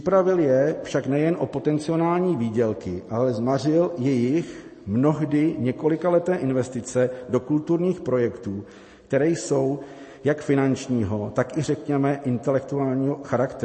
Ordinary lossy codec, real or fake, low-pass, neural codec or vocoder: MP3, 32 kbps; real; 9.9 kHz; none